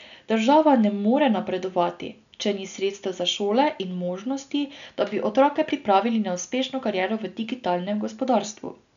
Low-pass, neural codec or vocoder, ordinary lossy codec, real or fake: 7.2 kHz; none; none; real